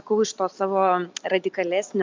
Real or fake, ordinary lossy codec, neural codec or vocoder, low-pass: real; MP3, 64 kbps; none; 7.2 kHz